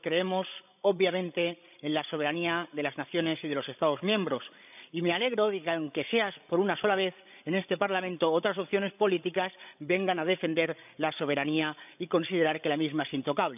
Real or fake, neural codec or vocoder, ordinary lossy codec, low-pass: fake; codec, 16 kHz, 16 kbps, FreqCodec, larger model; none; 3.6 kHz